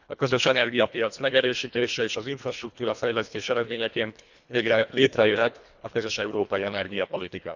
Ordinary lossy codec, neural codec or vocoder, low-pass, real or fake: none; codec, 24 kHz, 1.5 kbps, HILCodec; 7.2 kHz; fake